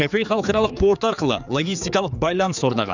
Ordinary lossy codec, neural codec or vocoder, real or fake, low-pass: none; codec, 16 kHz, 4 kbps, X-Codec, HuBERT features, trained on general audio; fake; 7.2 kHz